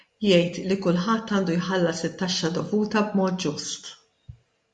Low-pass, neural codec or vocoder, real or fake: 10.8 kHz; none; real